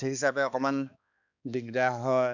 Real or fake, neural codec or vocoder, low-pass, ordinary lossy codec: fake; codec, 16 kHz, 2 kbps, X-Codec, HuBERT features, trained on balanced general audio; 7.2 kHz; none